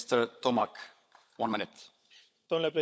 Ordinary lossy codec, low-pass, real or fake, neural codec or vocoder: none; none; fake; codec, 16 kHz, 16 kbps, FunCodec, trained on LibriTTS, 50 frames a second